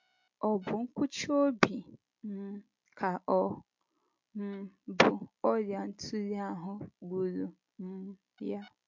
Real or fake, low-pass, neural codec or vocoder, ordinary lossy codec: real; 7.2 kHz; none; MP3, 48 kbps